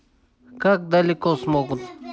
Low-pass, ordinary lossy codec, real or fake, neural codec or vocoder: none; none; real; none